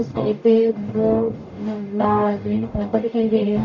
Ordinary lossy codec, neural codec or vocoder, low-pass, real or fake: none; codec, 44.1 kHz, 0.9 kbps, DAC; 7.2 kHz; fake